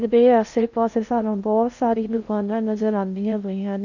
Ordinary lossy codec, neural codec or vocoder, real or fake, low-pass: none; codec, 16 kHz in and 24 kHz out, 0.6 kbps, FocalCodec, streaming, 2048 codes; fake; 7.2 kHz